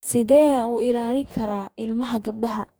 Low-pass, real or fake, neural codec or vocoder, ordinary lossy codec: none; fake; codec, 44.1 kHz, 2.6 kbps, DAC; none